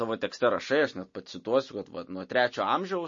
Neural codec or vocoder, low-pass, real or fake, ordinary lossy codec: none; 7.2 kHz; real; MP3, 32 kbps